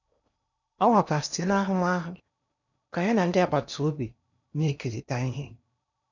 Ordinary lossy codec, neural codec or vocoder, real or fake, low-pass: none; codec, 16 kHz in and 24 kHz out, 0.8 kbps, FocalCodec, streaming, 65536 codes; fake; 7.2 kHz